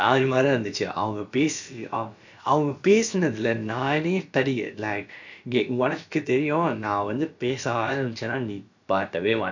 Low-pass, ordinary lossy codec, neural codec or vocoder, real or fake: 7.2 kHz; none; codec, 16 kHz, about 1 kbps, DyCAST, with the encoder's durations; fake